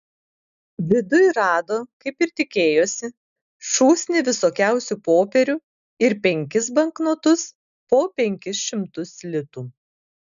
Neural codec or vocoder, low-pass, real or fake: none; 7.2 kHz; real